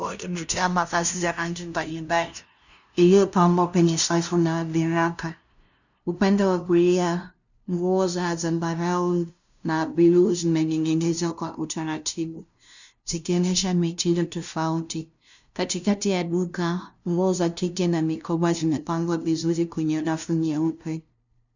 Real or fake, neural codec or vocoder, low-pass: fake; codec, 16 kHz, 0.5 kbps, FunCodec, trained on LibriTTS, 25 frames a second; 7.2 kHz